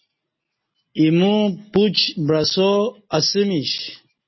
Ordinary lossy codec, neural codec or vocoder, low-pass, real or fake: MP3, 24 kbps; none; 7.2 kHz; real